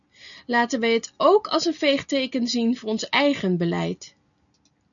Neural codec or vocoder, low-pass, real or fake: none; 7.2 kHz; real